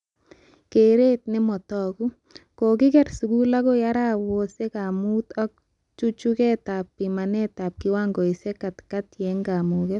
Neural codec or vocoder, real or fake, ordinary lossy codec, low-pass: none; real; none; 10.8 kHz